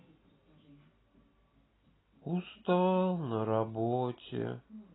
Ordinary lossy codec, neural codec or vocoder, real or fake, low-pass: AAC, 16 kbps; none; real; 7.2 kHz